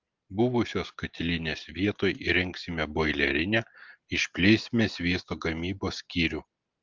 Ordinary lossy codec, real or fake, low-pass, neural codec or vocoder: Opus, 24 kbps; real; 7.2 kHz; none